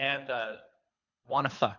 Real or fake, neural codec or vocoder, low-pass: fake; codec, 24 kHz, 3 kbps, HILCodec; 7.2 kHz